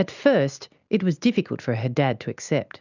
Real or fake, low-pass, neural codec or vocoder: real; 7.2 kHz; none